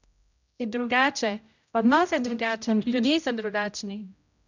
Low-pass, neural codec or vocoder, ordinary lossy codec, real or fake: 7.2 kHz; codec, 16 kHz, 0.5 kbps, X-Codec, HuBERT features, trained on general audio; none; fake